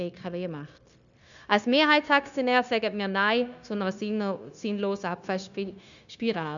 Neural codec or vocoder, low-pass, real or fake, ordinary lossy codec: codec, 16 kHz, 0.9 kbps, LongCat-Audio-Codec; 7.2 kHz; fake; none